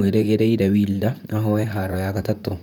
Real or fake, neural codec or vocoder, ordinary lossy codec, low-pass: fake; vocoder, 44.1 kHz, 128 mel bands, Pupu-Vocoder; none; 19.8 kHz